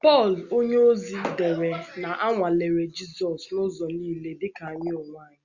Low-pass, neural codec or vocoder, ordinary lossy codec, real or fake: 7.2 kHz; none; Opus, 64 kbps; real